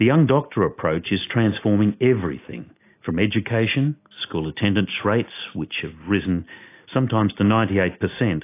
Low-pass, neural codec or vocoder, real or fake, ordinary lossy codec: 3.6 kHz; none; real; AAC, 24 kbps